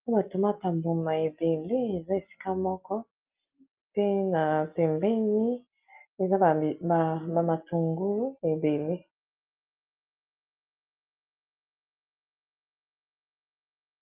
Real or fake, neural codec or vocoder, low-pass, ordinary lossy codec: real; none; 3.6 kHz; Opus, 24 kbps